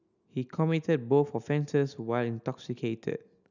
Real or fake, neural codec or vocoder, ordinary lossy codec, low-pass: real; none; none; 7.2 kHz